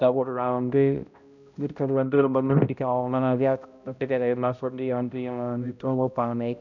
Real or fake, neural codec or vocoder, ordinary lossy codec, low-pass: fake; codec, 16 kHz, 0.5 kbps, X-Codec, HuBERT features, trained on balanced general audio; none; 7.2 kHz